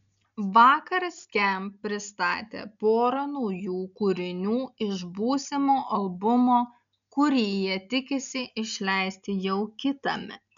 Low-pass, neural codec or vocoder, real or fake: 7.2 kHz; none; real